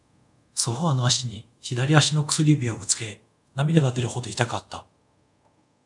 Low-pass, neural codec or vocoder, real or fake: 10.8 kHz; codec, 24 kHz, 0.5 kbps, DualCodec; fake